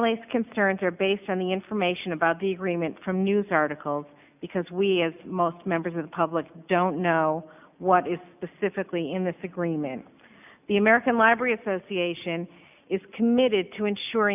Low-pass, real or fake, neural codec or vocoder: 3.6 kHz; real; none